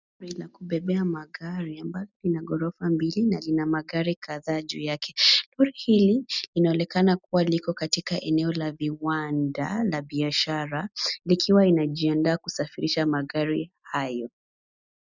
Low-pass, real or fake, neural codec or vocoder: 7.2 kHz; real; none